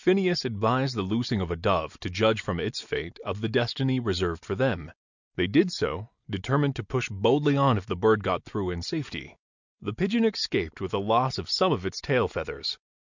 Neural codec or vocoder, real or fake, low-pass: none; real; 7.2 kHz